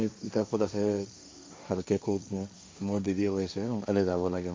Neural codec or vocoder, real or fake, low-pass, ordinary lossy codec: codec, 16 kHz, 1.1 kbps, Voila-Tokenizer; fake; none; none